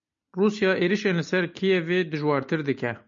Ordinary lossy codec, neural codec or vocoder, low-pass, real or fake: MP3, 48 kbps; none; 7.2 kHz; real